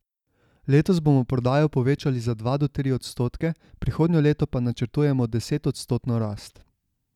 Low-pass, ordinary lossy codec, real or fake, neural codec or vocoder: 19.8 kHz; none; real; none